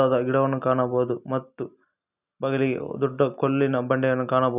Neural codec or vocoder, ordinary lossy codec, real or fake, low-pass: none; none; real; 3.6 kHz